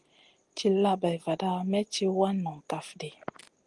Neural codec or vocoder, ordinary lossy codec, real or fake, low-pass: none; Opus, 24 kbps; real; 10.8 kHz